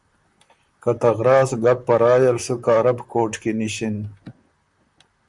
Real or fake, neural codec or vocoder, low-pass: fake; vocoder, 44.1 kHz, 128 mel bands, Pupu-Vocoder; 10.8 kHz